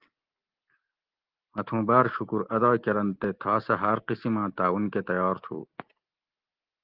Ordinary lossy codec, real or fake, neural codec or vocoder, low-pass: Opus, 16 kbps; real; none; 5.4 kHz